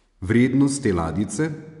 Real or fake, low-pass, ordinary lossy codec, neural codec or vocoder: real; 10.8 kHz; none; none